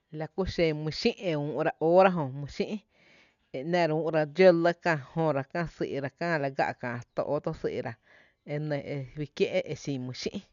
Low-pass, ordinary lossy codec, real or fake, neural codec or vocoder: 7.2 kHz; none; real; none